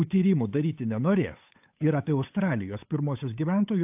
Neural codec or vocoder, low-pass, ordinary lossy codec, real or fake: codec, 16 kHz, 8 kbps, FunCodec, trained on Chinese and English, 25 frames a second; 3.6 kHz; AAC, 32 kbps; fake